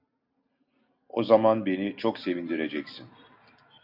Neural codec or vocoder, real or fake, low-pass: none; real; 5.4 kHz